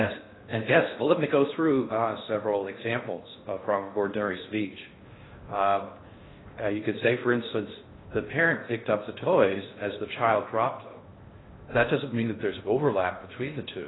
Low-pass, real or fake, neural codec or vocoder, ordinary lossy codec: 7.2 kHz; fake; codec, 16 kHz in and 24 kHz out, 0.6 kbps, FocalCodec, streaming, 4096 codes; AAC, 16 kbps